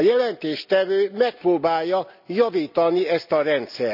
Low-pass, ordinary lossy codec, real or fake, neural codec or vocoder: 5.4 kHz; none; real; none